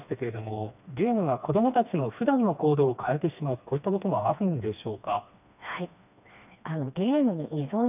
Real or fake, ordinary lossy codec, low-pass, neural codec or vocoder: fake; none; 3.6 kHz; codec, 16 kHz, 2 kbps, FreqCodec, smaller model